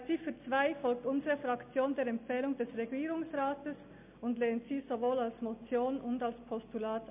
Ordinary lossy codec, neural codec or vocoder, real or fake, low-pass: MP3, 32 kbps; none; real; 3.6 kHz